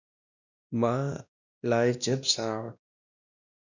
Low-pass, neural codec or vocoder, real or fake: 7.2 kHz; codec, 16 kHz, 1 kbps, X-Codec, WavLM features, trained on Multilingual LibriSpeech; fake